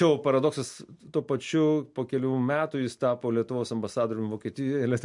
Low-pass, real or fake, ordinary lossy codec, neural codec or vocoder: 10.8 kHz; real; MP3, 64 kbps; none